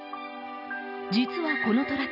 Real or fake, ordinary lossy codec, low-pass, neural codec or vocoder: real; none; 5.4 kHz; none